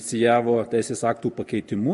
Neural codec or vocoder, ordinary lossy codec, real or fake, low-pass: none; MP3, 48 kbps; real; 14.4 kHz